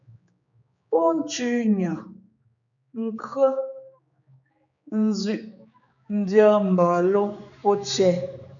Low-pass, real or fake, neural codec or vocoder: 7.2 kHz; fake; codec, 16 kHz, 4 kbps, X-Codec, HuBERT features, trained on general audio